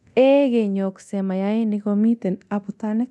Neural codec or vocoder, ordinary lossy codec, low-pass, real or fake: codec, 24 kHz, 0.9 kbps, DualCodec; none; none; fake